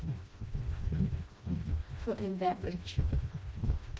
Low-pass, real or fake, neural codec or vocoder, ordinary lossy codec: none; fake; codec, 16 kHz, 1 kbps, FreqCodec, smaller model; none